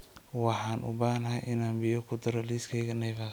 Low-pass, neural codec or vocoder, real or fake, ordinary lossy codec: none; none; real; none